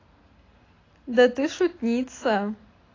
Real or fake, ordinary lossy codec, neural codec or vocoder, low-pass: real; AAC, 32 kbps; none; 7.2 kHz